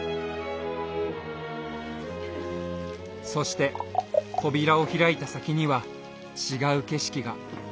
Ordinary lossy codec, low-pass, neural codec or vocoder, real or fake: none; none; none; real